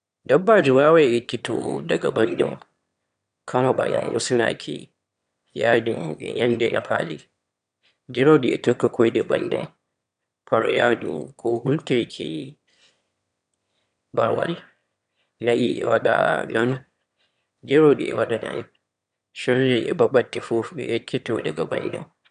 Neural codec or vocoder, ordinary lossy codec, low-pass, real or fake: autoencoder, 22.05 kHz, a latent of 192 numbers a frame, VITS, trained on one speaker; none; 9.9 kHz; fake